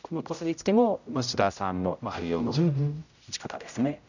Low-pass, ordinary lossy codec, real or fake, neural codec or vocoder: 7.2 kHz; none; fake; codec, 16 kHz, 0.5 kbps, X-Codec, HuBERT features, trained on general audio